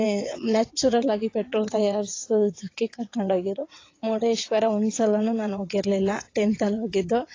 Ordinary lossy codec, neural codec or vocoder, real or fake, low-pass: AAC, 32 kbps; vocoder, 22.05 kHz, 80 mel bands, WaveNeXt; fake; 7.2 kHz